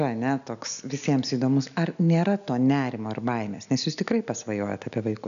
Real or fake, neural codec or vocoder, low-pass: real; none; 7.2 kHz